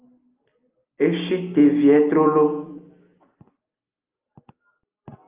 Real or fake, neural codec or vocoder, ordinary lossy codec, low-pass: real; none; Opus, 24 kbps; 3.6 kHz